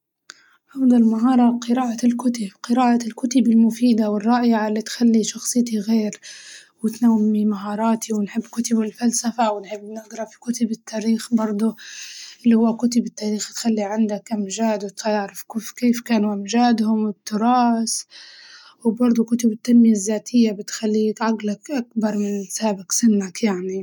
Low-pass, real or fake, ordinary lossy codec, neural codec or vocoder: 19.8 kHz; real; none; none